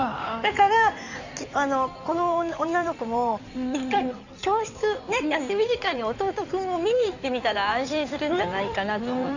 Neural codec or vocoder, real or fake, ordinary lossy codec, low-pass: codec, 16 kHz in and 24 kHz out, 2.2 kbps, FireRedTTS-2 codec; fake; none; 7.2 kHz